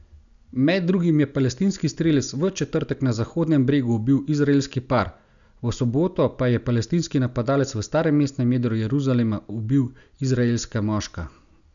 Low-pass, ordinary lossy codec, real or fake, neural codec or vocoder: 7.2 kHz; none; real; none